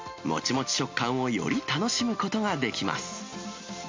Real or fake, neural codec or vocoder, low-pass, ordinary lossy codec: real; none; 7.2 kHz; MP3, 48 kbps